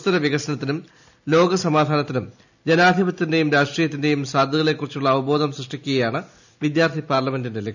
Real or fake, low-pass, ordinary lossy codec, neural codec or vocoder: real; 7.2 kHz; none; none